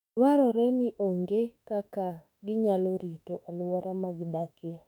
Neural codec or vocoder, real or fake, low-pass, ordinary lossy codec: autoencoder, 48 kHz, 32 numbers a frame, DAC-VAE, trained on Japanese speech; fake; 19.8 kHz; none